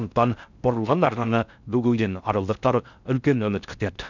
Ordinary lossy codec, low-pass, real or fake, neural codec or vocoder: none; 7.2 kHz; fake; codec, 16 kHz in and 24 kHz out, 0.6 kbps, FocalCodec, streaming, 4096 codes